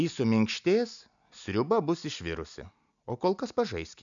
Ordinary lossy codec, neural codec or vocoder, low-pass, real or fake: MP3, 96 kbps; none; 7.2 kHz; real